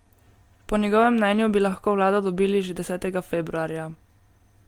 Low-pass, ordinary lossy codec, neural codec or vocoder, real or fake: 19.8 kHz; Opus, 24 kbps; none; real